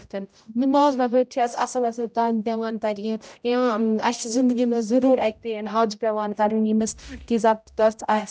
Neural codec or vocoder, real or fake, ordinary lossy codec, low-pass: codec, 16 kHz, 0.5 kbps, X-Codec, HuBERT features, trained on general audio; fake; none; none